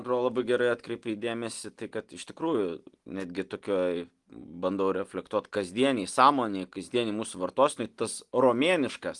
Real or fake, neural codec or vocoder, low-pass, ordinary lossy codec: real; none; 10.8 kHz; Opus, 24 kbps